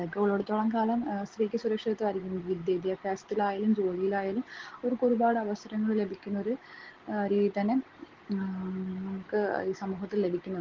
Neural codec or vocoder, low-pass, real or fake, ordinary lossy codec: none; 7.2 kHz; real; Opus, 16 kbps